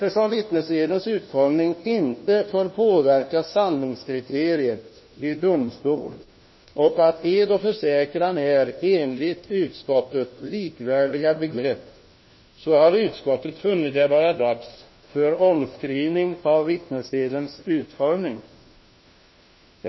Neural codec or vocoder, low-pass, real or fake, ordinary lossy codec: codec, 16 kHz, 1 kbps, FunCodec, trained on LibriTTS, 50 frames a second; 7.2 kHz; fake; MP3, 24 kbps